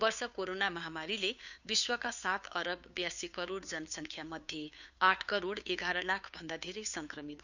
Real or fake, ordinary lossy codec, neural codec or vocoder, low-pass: fake; none; codec, 16 kHz, 2 kbps, FunCodec, trained on Chinese and English, 25 frames a second; 7.2 kHz